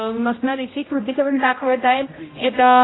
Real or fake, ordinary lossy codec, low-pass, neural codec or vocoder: fake; AAC, 16 kbps; 7.2 kHz; codec, 16 kHz, 0.5 kbps, X-Codec, HuBERT features, trained on general audio